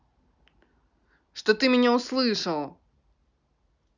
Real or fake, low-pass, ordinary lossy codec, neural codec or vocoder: real; 7.2 kHz; none; none